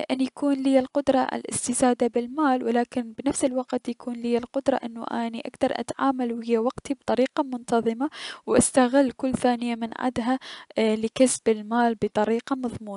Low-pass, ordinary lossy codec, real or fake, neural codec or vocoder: 10.8 kHz; none; real; none